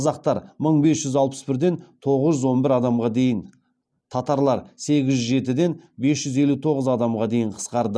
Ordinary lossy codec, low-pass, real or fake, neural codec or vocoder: none; none; real; none